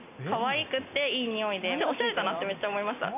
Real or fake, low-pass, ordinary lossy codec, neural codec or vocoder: real; 3.6 kHz; none; none